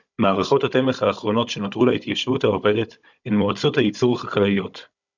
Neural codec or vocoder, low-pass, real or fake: codec, 16 kHz, 16 kbps, FunCodec, trained on Chinese and English, 50 frames a second; 7.2 kHz; fake